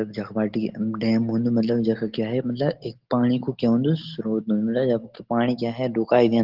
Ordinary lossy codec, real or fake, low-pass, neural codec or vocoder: Opus, 16 kbps; real; 5.4 kHz; none